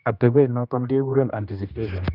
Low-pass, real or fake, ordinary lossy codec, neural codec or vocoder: 5.4 kHz; fake; none; codec, 16 kHz, 1 kbps, X-Codec, HuBERT features, trained on general audio